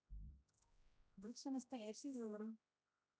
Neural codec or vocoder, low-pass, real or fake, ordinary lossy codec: codec, 16 kHz, 0.5 kbps, X-Codec, HuBERT features, trained on general audio; none; fake; none